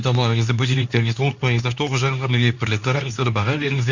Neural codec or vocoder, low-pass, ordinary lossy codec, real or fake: codec, 24 kHz, 0.9 kbps, WavTokenizer, medium speech release version 2; 7.2 kHz; none; fake